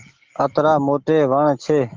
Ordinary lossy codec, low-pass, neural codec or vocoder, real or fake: Opus, 16 kbps; 7.2 kHz; none; real